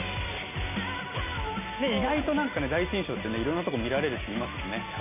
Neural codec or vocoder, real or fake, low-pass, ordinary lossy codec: none; real; 3.6 kHz; none